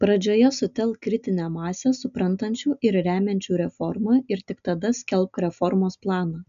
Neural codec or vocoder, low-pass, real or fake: none; 7.2 kHz; real